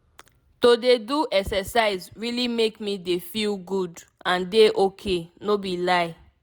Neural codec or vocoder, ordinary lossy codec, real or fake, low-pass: none; none; real; none